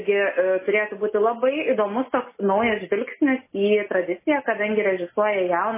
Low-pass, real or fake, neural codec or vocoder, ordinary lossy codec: 3.6 kHz; real; none; MP3, 16 kbps